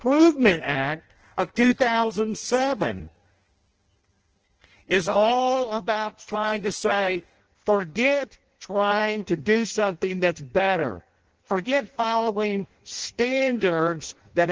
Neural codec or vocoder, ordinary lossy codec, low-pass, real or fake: codec, 16 kHz in and 24 kHz out, 0.6 kbps, FireRedTTS-2 codec; Opus, 16 kbps; 7.2 kHz; fake